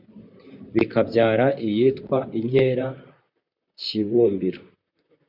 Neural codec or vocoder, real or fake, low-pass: vocoder, 44.1 kHz, 128 mel bands, Pupu-Vocoder; fake; 5.4 kHz